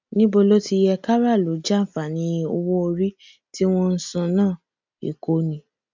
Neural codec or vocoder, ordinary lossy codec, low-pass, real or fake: none; MP3, 64 kbps; 7.2 kHz; real